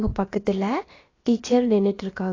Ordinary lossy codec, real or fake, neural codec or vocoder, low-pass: AAC, 32 kbps; fake; codec, 16 kHz, about 1 kbps, DyCAST, with the encoder's durations; 7.2 kHz